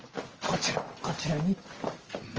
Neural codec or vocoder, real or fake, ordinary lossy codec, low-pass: none; real; Opus, 24 kbps; 7.2 kHz